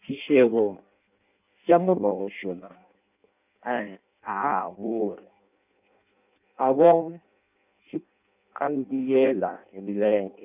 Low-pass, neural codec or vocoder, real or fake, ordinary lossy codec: 3.6 kHz; codec, 16 kHz in and 24 kHz out, 0.6 kbps, FireRedTTS-2 codec; fake; none